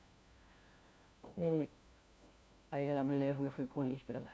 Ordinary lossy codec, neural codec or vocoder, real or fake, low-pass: none; codec, 16 kHz, 1 kbps, FunCodec, trained on LibriTTS, 50 frames a second; fake; none